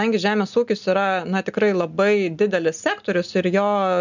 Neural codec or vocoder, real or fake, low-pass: none; real; 7.2 kHz